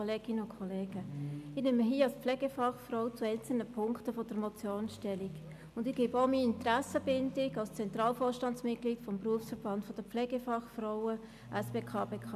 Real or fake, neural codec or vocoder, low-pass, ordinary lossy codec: real; none; 14.4 kHz; AAC, 96 kbps